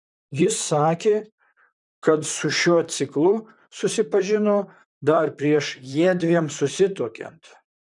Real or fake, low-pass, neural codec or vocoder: fake; 10.8 kHz; vocoder, 44.1 kHz, 128 mel bands, Pupu-Vocoder